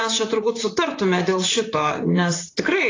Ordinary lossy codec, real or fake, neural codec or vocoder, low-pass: AAC, 32 kbps; fake; vocoder, 44.1 kHz, 128 mel bands, Pupu-Vocoder; 7.2 kHz